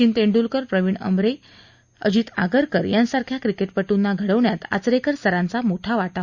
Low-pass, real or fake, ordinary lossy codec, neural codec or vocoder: 7.2 kHz; real; Opus, 64 kbps; none